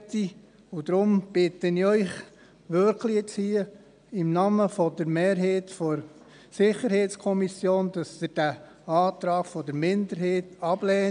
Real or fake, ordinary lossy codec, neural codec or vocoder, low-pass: real; none; none; 9.9 kHz